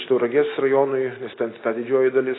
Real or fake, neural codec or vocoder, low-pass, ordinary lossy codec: real; none; 7.2 kHz; AAC, 16 kbps